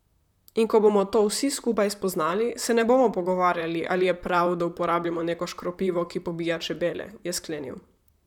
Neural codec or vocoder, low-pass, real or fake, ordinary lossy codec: vocoder, 44.1 kHz, 128 mel bands, Pupu-Vocoder; 19.8 kHz; fake; none